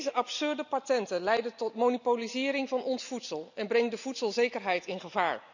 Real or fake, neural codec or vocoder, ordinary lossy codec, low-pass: real; none; none; 7.2 kHz